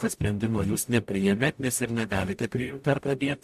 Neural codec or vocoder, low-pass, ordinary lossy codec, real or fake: codec, 44.1 kHz, 0.9 kbps, DAC; 14.4 kHz; MP3, 64 kbps; fake